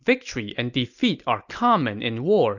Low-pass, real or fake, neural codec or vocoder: 7.2 kHz; real; none